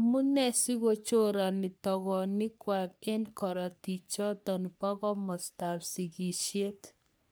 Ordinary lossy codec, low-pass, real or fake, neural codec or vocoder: none; none; fake; codec, 44.1 kHz, 3.4 kbps, Pupu-Codec